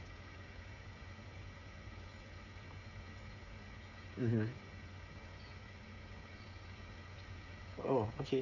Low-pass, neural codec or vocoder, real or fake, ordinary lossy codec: 7.2 kHz; codec, 16 kHz, 16 kbps, FreqCodec, smaller model; fake; none